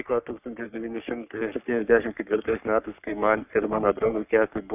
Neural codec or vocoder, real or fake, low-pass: codec, 44.1 kHz, 3.4 kbps, Pupu-Codec; fake; 3.6 kHz